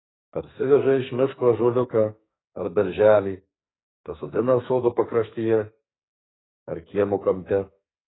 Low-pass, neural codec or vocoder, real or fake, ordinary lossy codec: 7.2 kHz; codec, 44.1 kHz, 2.6 kbps, SNAC; fake; AAC, 16 kbps